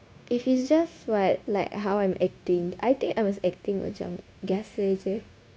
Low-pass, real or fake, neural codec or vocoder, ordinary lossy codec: none; fake; codec, 16 kHz, 0.9 kbps, LongCat-Audio-Codec; none